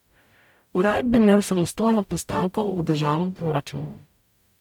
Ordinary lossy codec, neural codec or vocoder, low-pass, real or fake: none; codec, 44.1 kHz, 0.9 kbps, DAC; 19.8 kHz; fake